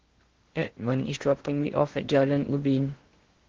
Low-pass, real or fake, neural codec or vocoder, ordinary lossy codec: 7.2 kHz; fake; codec, 16 kHz in and 24 kHz out, 0.6 kbps, FocalCodec, streaming, 4096 codes; Opus, 16 kbps